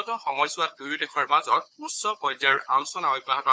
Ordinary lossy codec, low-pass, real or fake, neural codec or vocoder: none; none; fake; codec, 16 kHz, 4 kbps, FunCodec, trained on Chinese and English, 50 frames a second